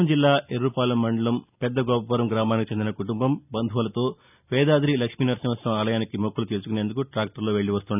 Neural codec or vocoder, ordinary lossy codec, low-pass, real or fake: none; none; 3.6 kHz; real